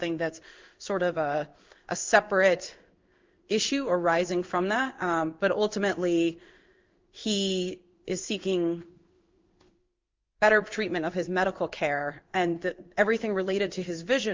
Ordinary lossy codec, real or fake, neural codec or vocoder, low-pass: Opus, 24 kbps; fake; codec, 16 kHz in and 24 kHz out, 1 kbps, XY-Tokenizer; 7.2 kHz